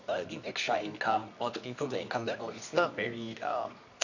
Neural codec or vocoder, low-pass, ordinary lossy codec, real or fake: codec, 24 kHz, 0.9 kbps, WavTokenizer, medium music audio release; 7.2 kHz; none; fake